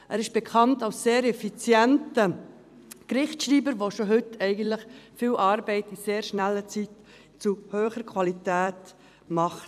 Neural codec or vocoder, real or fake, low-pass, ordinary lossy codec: vocoder, 44.1 kHz, 128 mel bands every 256 samples, BigVGAN v2; fake; 14.4 kHz; none